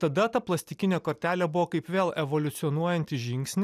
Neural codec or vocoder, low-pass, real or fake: none; 14.4 kHz; real